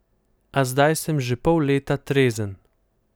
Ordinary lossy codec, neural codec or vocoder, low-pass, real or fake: none; none; none; real